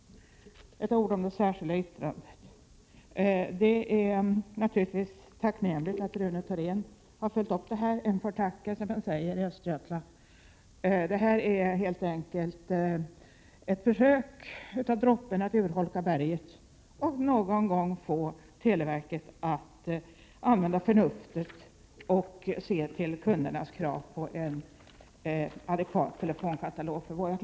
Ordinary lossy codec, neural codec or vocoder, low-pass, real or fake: none; none; none; real